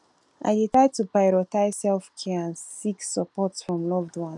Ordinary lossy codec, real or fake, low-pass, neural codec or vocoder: none; real; 10.8 kHz; none